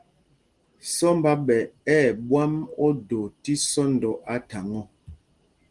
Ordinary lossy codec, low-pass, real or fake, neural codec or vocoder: Opus, 24 kbps; 10.8 kHz; real; none